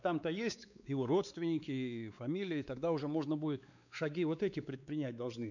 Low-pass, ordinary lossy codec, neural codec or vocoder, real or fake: 7.2 kHz; none; codec, 16 kHz, 4 kbps, X-Codec, WavLM features, trained on Multilingual LibriSpeech; fake